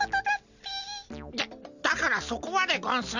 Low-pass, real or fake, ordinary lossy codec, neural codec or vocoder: 7.2 kHz; real; none; none